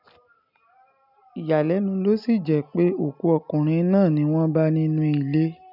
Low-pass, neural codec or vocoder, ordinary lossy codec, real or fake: 5.4 kHz; none; none; real